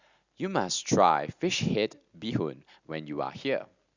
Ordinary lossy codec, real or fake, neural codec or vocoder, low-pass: Opus, 64 kbps; real; none; 7.2 kHz